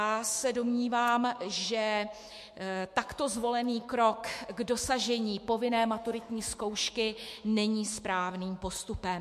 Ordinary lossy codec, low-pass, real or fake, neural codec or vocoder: MP3, 64 kbps; 14.4 kHz; fake; autoencoder, 48 kHz, 128 numbers a frame, DAC-VAE, trained on Japanese speech